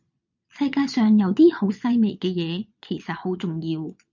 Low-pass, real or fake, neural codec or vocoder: 7.2 kHz; fake; vocoder, 22.05 kHz, 80 mel bands, Vocos